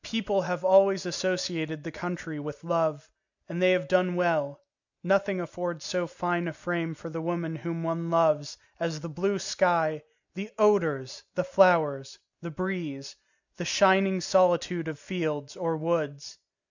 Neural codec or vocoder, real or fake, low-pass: none; real; 7.2 kHz